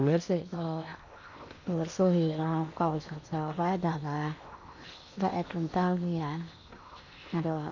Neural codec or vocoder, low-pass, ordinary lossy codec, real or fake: codec, 16 kHz in and 24 kHz out, 0.8 kbps, FocalCodec, streaming, 65536 codes; 7.2 kHz; none; fake